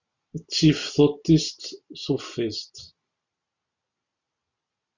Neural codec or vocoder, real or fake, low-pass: none; real; 7.2 kHz